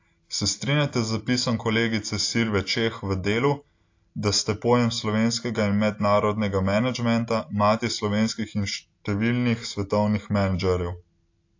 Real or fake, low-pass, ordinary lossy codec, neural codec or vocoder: real; 7.2 kHz; AAC, 48 kbps; none